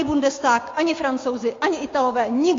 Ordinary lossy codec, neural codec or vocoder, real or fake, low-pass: AAC, 32 kbps; codec, 16 kHz, 6 kbps, DAC; fake; 7.2 kHz